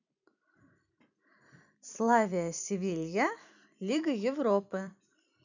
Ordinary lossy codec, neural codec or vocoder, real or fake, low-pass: none; vocoder, 44.1 kHz, 128 mel bands every 256 samples, BigVGAN v2; fake; 7.2 kHz